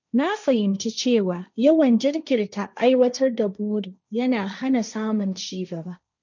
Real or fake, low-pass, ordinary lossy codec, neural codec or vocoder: fake; 7.2 kHz; none; codec, 16 kHz, 1.1 kbps, Voila-Tokenizer